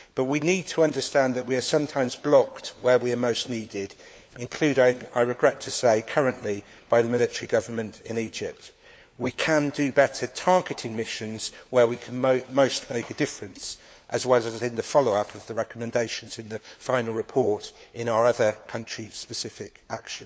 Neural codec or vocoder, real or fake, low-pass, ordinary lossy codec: codec, 16 kHz, 4 kbps, FunCodec, trained on LibriTTS, 50 frames a second; fake; none; none